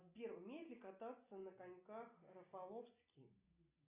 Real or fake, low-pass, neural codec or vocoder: real; 3.6 kHz; none